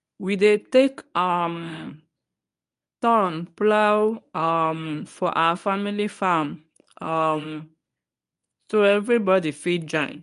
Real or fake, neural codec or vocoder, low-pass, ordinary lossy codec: fake; codec, 24 kHz, 0.9 kbps, WavTokenizer, medium speech release version 1; 10.8 kHz; none